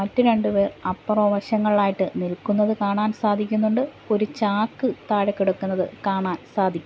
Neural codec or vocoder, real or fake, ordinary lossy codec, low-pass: none; real; none; none